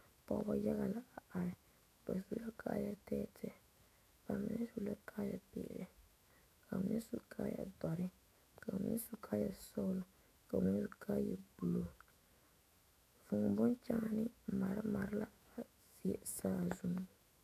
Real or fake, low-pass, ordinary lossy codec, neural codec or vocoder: fake; 14.4 kHz; AAC, 96 kbps; autoencoder, 48 kHz, 128 numbers a frame, DAC-VAE, trained on Japanese speech